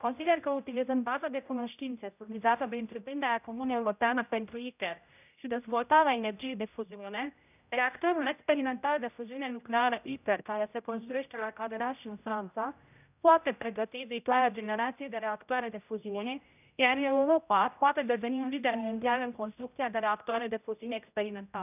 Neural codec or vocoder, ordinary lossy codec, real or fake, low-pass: codec, 16 kHz, 0.5 kbps, X-Codec, HuBERT features, trained on general audio; none; fake; 3.6 kHz